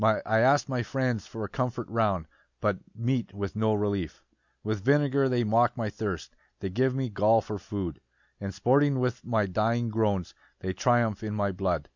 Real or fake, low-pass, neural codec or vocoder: real; 7.2 kHz; none